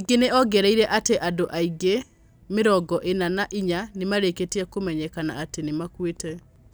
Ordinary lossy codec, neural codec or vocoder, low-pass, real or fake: none; none; none; real